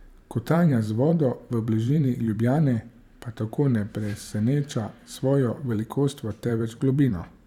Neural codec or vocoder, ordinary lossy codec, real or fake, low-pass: vocoder, 44.1 kHz, 128 mel bands every 512 samples, BigVGAN v2; none; fake; 19.8 kHz